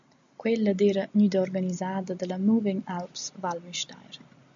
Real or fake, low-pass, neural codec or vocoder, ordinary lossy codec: real; 7.2 kHz; none; AAC, 64 kbps